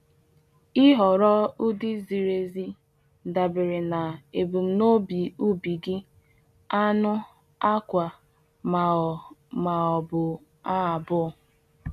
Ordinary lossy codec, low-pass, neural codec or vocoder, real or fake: none; 14.4 kHz; none; real